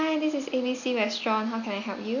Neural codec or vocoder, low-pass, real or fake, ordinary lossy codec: none; 7.2 kHz; real; none